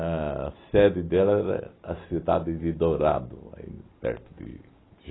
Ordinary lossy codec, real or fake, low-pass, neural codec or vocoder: AAC, 16 kbps; real; 7.2 kHz; none